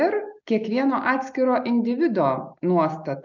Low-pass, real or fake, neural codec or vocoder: 7.2 kHz; real; none